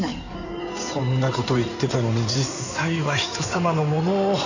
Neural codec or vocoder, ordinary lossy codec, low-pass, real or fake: codec, 16 kHz in and 24 kHz out, 2.2 kbps, FireRedTTS-2 codec; none; 7.2 kHz; fake